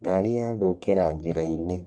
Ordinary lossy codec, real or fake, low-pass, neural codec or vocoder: none; fake; 9.9 kHz; codec, 44.1 kHz, 1.7 kbps, Pupu-Codec